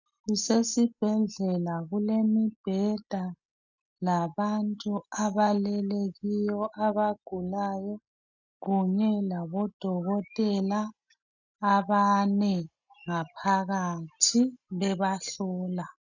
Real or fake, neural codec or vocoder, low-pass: real; none; 7.2 kHz